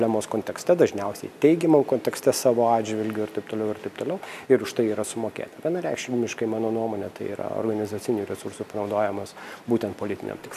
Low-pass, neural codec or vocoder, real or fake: 14.4 kHz; none; real